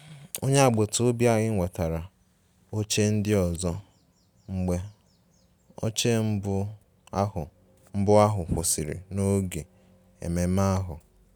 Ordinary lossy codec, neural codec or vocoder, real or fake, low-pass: none; none; real; none